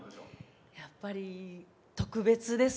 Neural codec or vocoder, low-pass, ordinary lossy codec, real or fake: none; none; none; real